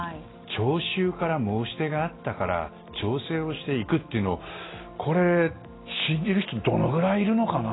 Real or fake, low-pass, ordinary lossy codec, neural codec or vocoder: real; 7.2 kHz; AAC, 16 kbps; none